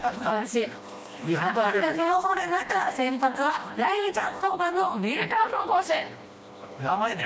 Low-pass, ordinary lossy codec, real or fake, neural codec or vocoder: none; none; fake; codec, 16 kHz, 1 kbps, FreqCodec, smaller model